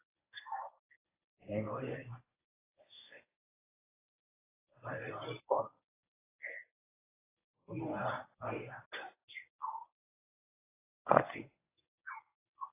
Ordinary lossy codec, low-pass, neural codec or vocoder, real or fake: AAC, 16 kbps; 3.6 kHz; codec, 24 kHz, 0.9 kbps, WavTokenizer, medium speech release version 1; fake